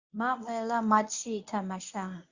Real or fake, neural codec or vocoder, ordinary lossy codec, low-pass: fake; codec, 24 kHz, 0.9 kbps, WavTokenizer, medium speech release version 1; Opus, 64 kbps; 7.2 kHz